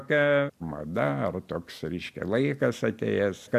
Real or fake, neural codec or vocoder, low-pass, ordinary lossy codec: real; none; 14.4 kHz; MP3, 96 kbps